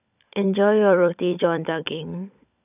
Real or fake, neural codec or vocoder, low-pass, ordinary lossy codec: fake; codec, 16 kHz, 16 kbps, FunCodec, trained on LibriTTS, 50 frames a second; 3.6 kHz; none